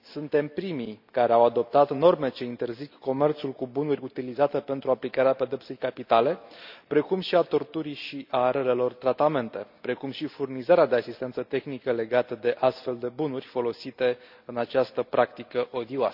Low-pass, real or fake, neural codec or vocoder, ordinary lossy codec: 5.4 kHz; real; none; none